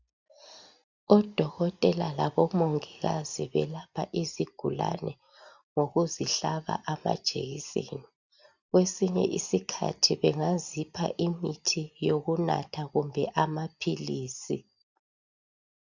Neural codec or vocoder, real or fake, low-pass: none; real; 7.2 kHz